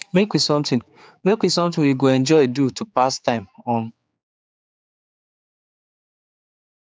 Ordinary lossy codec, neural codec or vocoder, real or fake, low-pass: none; codec, 16 kHz, 4 kbps, X-Codec, HuBERT features, trained on general audio; fake; none